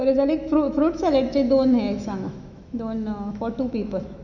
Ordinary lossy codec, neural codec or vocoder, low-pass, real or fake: none; autoencoder, 48 kHz, 128 numbers a frame, DAC-VAE, trained on Japanese speech; 7.2 kHz; fake